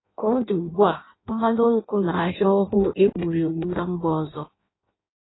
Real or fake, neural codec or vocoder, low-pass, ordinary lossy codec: fake; codec, 16 kHz in and 24 kHz out, 0.6 kbps, FireRedTTS-2 codec; 7.2 kHz; AAC, 16 kbps